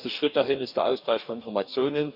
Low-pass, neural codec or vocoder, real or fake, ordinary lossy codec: 5.4 kHz; codec, 44.1 kHz, 2.6 kbps, DAC; fake; none